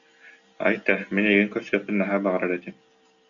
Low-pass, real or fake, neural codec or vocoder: 7.2 kHz; real; none